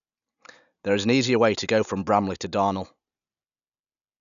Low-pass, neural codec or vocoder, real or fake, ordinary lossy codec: 7.2 kHz; none; real; none